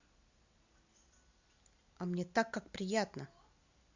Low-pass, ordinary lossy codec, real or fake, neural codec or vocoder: 7.2 kHz; Opus, 64 kbps; real; none